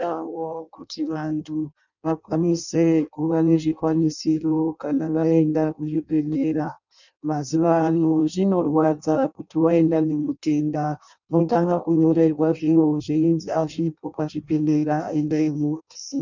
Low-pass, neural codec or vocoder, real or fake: 7.2 kHz; codec, 16 kHz in and 24 kHz out, 0.6 kbps, FireRedTTS-2 codec; fake